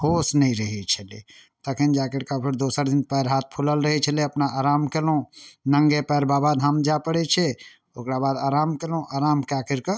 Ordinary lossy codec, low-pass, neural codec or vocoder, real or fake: none; none; none; real